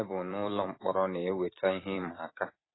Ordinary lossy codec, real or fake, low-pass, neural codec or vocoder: AAC, 16 kbps; fake; 7.2 kHz; vocoder, 44.1 kHz, 128 mel bands every 256 samples, BigVGAN v2